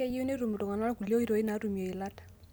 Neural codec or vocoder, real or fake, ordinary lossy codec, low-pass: none; real; none; none